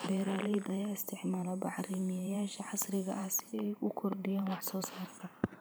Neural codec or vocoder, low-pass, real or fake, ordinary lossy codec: vocoder, 44.1 kHz, 128 mel bands every 512 samples, BigVGAN v2; none; fake; none